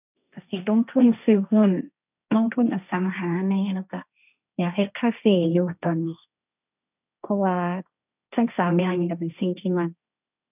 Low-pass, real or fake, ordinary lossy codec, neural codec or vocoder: 3.6 kHz; fake; none; codec, 16 kHz, 1.1 kbps, Voila-Tokenizer